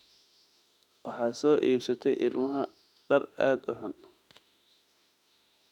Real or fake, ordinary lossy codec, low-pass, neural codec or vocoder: fake; none; 19.8 kHz; autoencoder, 48 kHz, 32 numbers a frame, DAC-VAE, trained on Japanese speech